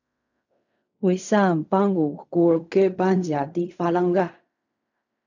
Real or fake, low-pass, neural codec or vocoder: fake; 7.2 kHz; codec, 16 kHz in and 24 kHz out, 0.4 kbps, LongCat-Audio-Codec, fine tuned four codebook decoder